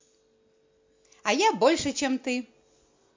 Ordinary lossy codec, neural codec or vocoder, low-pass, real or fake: MP3, 48 kbps; none; 7.2 kHz; real